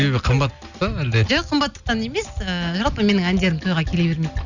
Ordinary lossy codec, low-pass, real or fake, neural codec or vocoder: none; 7.2 kHz; real; none